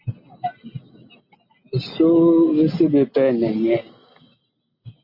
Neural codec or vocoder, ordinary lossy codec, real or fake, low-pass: none; MP3, 32 kbps; real; 5.4 kHz